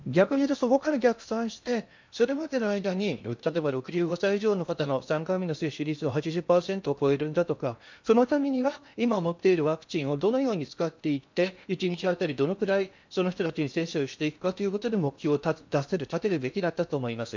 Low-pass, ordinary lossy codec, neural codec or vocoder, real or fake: 7.2 kHz; MP3, 64 kbps; codec, 16 kHz in and 24 kHz out, 0.8 kbps, FocalCodec, streaming, 65536 codes; fake